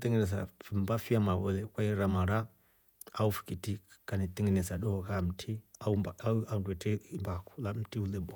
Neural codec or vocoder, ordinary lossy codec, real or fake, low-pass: vocoder, 48 kHz, 128 mel bands, Vocos; none; fake; none